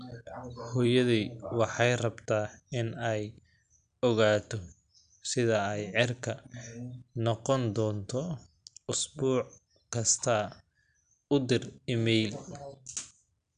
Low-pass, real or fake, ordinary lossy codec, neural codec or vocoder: 9.9 kHz; real; none; none